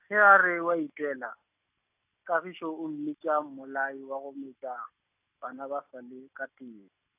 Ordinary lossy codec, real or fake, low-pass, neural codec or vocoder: AAC, 32 kbps; real; 3.6 kHz; none